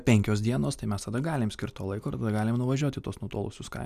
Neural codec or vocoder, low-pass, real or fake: none; 14.4 kHz; real